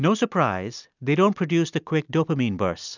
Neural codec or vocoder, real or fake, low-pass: none; real; 7.2 kHz